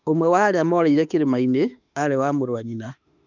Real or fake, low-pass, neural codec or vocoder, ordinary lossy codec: fake; 7.2 kHz; autoencoder, 48 kHz, 32 numbers a frame, DAC-VAE, trained on Japanese speech; none